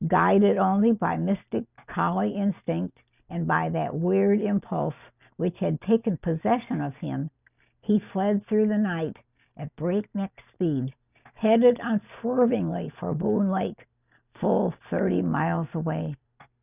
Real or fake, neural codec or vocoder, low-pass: real; none; 3.6 kHz